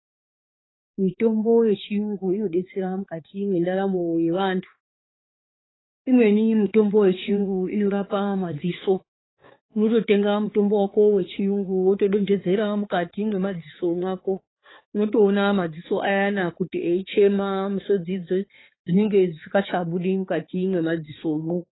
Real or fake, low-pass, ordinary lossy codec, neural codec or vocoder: fake; 7.2 kHz; AAC, 16 kbps; codec, 16 kHz, 4 kbps, X-Codec, HuBERT features, trained on balanced general audio